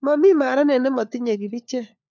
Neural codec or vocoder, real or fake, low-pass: codec, 16 kHz, 4 kbps, FunCodec, trained on LibriTTS, 50 frames a second; fake; 7.2 kHz